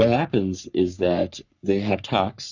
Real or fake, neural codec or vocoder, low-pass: fake; codec, 44.1 kHz, 3.4 kbps, Pupu-Codec; 7.2 kHz